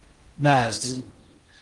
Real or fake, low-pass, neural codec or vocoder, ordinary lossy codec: fake; 10.8 kHz; codec, 16 kHz in and 24 kHz out, 0.6 kbps, FocalCodec, streaming, 4096 codes; Opus, 24 kbps